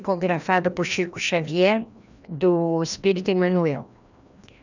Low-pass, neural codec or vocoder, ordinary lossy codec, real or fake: 7.2 kHz; codec, 16 kHz, 1 kbps, FreqCodec, larger model; none; fake